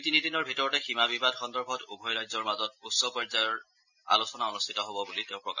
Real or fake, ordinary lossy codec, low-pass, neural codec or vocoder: real; none; 7.2 kHz; none